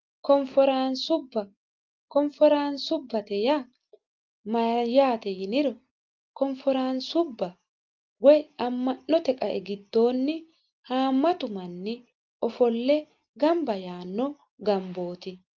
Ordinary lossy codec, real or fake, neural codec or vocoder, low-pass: Opus, 32 kbps; real; none; 7.2 kHz